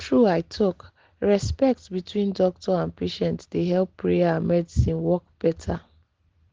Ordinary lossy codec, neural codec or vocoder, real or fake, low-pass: Opus, 16 kbps; none; real; 7.2 kHz